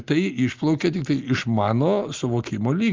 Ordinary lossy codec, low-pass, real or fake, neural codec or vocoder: Opus, 32 kbps; 7.2 kHz; real; none